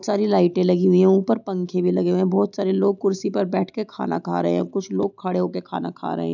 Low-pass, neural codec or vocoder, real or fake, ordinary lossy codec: 7.2 kHz; none; real; none